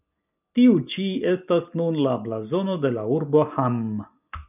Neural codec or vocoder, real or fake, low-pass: none; real; 3.6 kHz